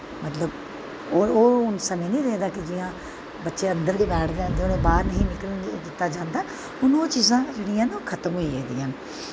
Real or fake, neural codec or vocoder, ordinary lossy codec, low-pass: real; none; none; none